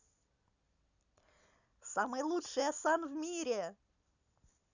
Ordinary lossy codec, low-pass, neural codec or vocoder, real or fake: none; 7.2 kHz; none; real